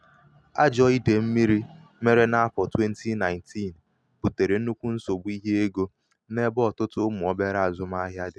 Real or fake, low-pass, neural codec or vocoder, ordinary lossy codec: real; none; none; none